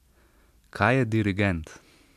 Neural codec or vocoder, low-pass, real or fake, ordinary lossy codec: none; 14.4 kHz; real; MP3, 96 kbps